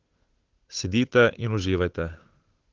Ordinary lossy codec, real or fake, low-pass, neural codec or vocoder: Opus, 16 kbps; fake; 7.2 kHz; codec, 16 kHz, 8 kbps, FunCodec, trained on Chinese and English, 25 frames a second